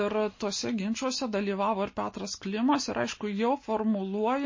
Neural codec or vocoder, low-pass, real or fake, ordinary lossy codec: none; 7.2 kHz; real; MP3, 32 kbps